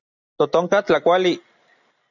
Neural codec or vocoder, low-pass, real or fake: none; 7.2 kHz; real